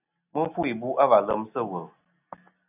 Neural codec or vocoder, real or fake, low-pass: none; real; 3.6 kHz